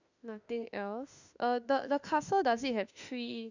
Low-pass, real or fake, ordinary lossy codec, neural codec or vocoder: 7.2 kHz; fake; none; autoencoder, 48 kHz, 32 numbers a frame, DAC-VAE, trained on Japanese speech